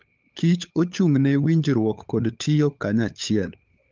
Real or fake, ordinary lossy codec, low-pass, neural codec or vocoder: fake; Opus, 32 kbps; 7.2 kHz; codec, 16 kHz, 8 kbps, FunCodec, trained on LibriTTS, 25 frames a second